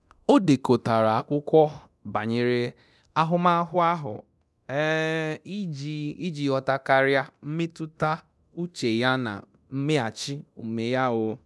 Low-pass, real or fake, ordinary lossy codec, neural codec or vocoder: none; fake; none; codec, 24 kHz, 0.9 kbps, DualCodec